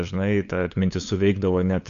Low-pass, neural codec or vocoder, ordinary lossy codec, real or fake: 7.2 kHz; codec, 16 kHz, 8 kbps, FunCodec, trained on LibriTTS, 25 frames a second; AAC, 64 kbps; fake